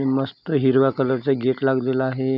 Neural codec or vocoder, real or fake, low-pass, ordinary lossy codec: none; real; 5.4 kHz; none